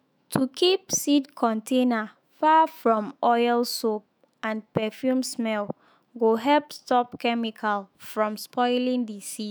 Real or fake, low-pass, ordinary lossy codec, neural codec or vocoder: fake; none; none; autoencoder, 48 kHz, 128 numbers a frame, DAC-VAE, trained on Japanese speech